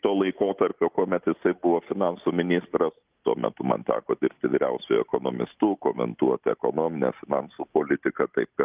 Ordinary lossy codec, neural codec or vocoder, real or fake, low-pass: Opus, 24 kbps; none; real; 3.6 kHz